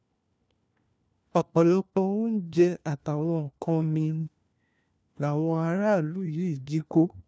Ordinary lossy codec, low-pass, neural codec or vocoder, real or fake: none; none; codec, 16 kHz, 1 kbps, FunCodec, trained on LibriTTS, 50 frames a second; fake